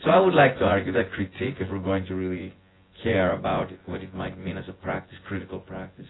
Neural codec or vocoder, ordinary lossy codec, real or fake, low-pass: vocoder, 24 kHz, 100 mel bands, Vocos; AAC, 16 kbps; fake; 7.2 kHz